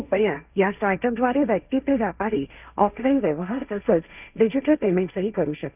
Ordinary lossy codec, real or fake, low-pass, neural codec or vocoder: none; fake; 3.6 kHz; codec, 16 kHz, 1.1 kbps, Voila-Tokenizer